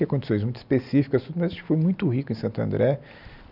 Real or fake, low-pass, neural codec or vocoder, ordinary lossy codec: real; 5.4 kHz; none; none